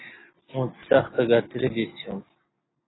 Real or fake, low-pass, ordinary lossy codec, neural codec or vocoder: real; 7.2 kHz; AAC, 16 kbps; none